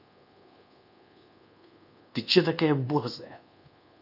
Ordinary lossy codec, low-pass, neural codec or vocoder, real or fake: none; 5.4 kHz; codec, 24 kHz, 1.2 kbps, DualCodec; fake